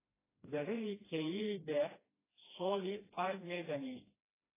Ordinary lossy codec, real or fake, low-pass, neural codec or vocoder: AAC, 16 kbps; fake; 3.6 kHz; codec, 16 kHz, 1 kbps, FreqCodec, smaller model